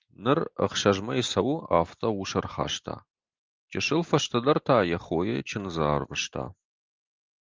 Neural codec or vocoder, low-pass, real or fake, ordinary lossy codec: none; 7.2 kHz; real; Opus, 24 kbps